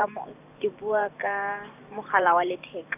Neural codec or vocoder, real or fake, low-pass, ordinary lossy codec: vocoder, 44.1 kHz, 128 mel bands every 512 samples, BigVGAN v2; fake; 3.6 kHz; none